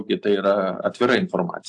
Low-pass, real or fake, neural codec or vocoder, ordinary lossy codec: 10.8 kHz; real; none; AAC, 64 kbps